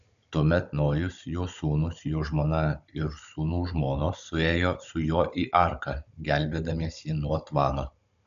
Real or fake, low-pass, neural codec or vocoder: fake; 7.2 kHz; codec, 16 kHz, 16 kbps, FunCodec, trained on Chinese and English, 50 frames a second